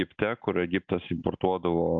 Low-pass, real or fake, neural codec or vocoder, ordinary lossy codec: 7.2 kHz; real; none; AAC, 64 kbps